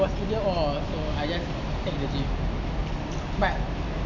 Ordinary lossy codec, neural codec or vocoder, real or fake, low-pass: none; none; real; 7.2 kHz